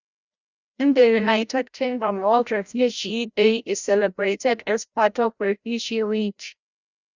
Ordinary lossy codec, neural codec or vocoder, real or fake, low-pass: Opus, 64 kbps; codec, 16 kHz, 0.5 kbps, FreqCodec, larger model; fake; 7.2 kHz